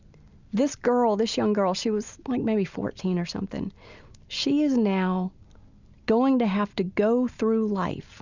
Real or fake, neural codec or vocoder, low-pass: real; none; 7.2 kHz